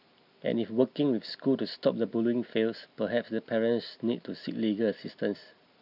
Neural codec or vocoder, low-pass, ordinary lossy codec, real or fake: none; 5.4 kHz; none; real